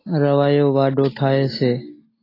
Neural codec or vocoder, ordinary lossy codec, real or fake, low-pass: none; AAC, 24 kbps; real; 5.4 kHz